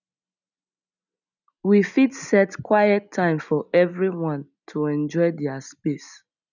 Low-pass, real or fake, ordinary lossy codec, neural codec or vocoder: 7.2 kHz; real; none; none